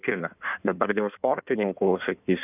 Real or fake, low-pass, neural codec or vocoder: fake; 3.6 kHz; codec, 16 kHz in and 24 kHz out, 1.1 kbps, FireRedTTS-2 codec